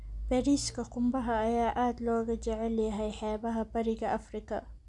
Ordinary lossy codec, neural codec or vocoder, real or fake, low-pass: none; none; real; 10.8 kHz